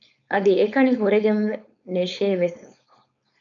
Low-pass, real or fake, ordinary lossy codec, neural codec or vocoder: 7.2 kHz; fake; MP3, 96 kbps; codec, 16 kHz, 4.8 kbps, FACodec